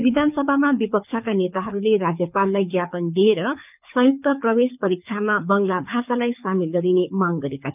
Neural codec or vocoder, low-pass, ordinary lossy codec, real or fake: codec, 24 kHz, 6 kbps, HILCodec; 3.6 kHz; none; fake